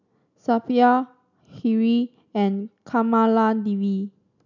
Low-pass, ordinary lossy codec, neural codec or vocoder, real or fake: 7.2 kHz; none; none; real